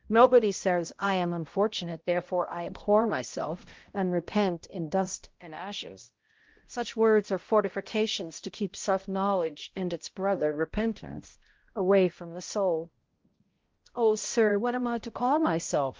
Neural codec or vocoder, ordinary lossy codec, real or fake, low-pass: codec, 16 kHz, 0.5 kbps, X-Codec, HuBERT features, trained on balanced general audio; Opus, 16 kbps; fake; 7.2 kHz